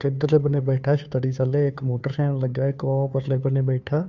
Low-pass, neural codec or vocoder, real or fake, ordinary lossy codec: 7.2 kHz; codec, 16 kHz, 2 kbps, FunCodec, trained on Chinese and English, 25 frames a second; fake; Opus, 64 kbps